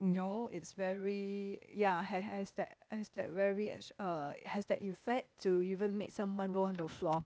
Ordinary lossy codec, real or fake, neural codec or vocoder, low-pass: none; fake; codec, 16 kHz, 0.8 kbps, ZipCodec; none